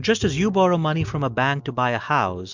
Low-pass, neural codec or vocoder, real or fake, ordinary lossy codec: 7.2 kHz; none; real; MP3, 64 kbps